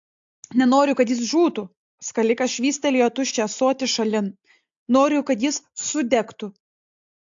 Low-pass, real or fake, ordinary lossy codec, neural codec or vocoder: 7.2 kHz; real; AAC, 64 kbps; none